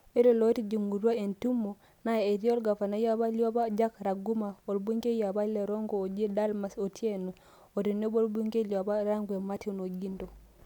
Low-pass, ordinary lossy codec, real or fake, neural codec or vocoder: 19.8 kHz; none; real; none